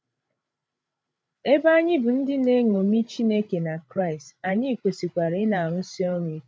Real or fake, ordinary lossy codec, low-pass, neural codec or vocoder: fake; none; none; codec, 16 kHz, 16 kbps, FreqCodec, larger model